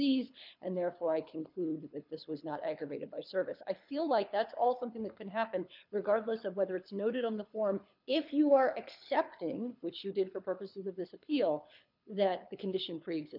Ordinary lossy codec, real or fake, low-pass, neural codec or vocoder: MP3, 48 kbps; fake; 5.4 kHz; codec, 24 kHz, 6 kbps, HILCodec